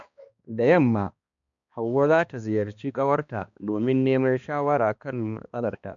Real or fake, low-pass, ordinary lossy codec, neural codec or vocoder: fake; 7.2 kHz; MP3, 64 kbps; codec, 16 kHz, 2 kbps, X-Codec, HuBERT features, trained on balanced general audio